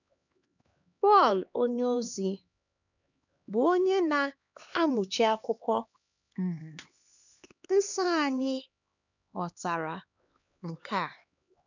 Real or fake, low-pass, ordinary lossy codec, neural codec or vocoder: fake; 7.2 kHz; none; codec, 16 kHz, 2 kbps, X-Codec, HuBERT features, trained on LibriSpeech